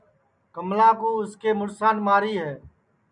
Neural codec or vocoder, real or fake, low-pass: none; real; 9.9 kHz